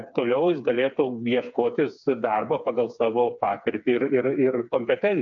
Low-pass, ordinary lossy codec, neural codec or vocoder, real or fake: 7.2 kHz; AAC, 64 kbps; codec, 16 kHz, 4 kbps, FreqCodec, smaller model; fake